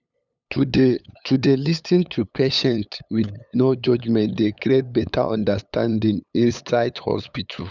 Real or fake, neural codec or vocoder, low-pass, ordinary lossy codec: fake; codec, 16 kHz, 8 kbps, FunCodec, trained on LibriTTS, 25 frames a second; 7.2 kHz; none